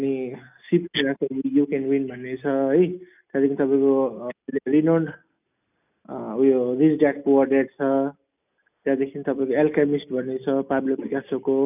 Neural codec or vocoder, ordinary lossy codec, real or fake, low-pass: none; none; real; 3.6 kHz